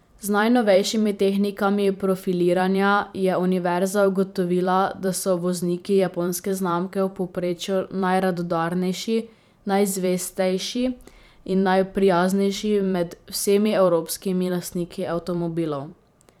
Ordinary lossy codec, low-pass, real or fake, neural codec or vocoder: none; 19.8 kHz; fake; vocoder, 44.1 kHz, 128 mel bands every 512 samples, BigVGAN v2